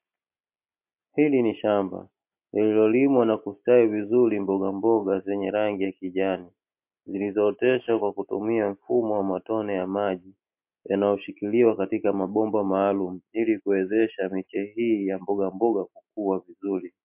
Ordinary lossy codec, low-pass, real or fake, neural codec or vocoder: MP3, 32 kbps; 3.6 kHz; real; none